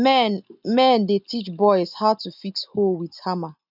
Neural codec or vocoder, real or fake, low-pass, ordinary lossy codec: none; real; 5.4 kHz; none